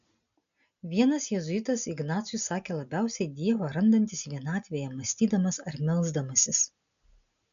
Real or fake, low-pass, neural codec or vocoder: real; 7.2 kHz; none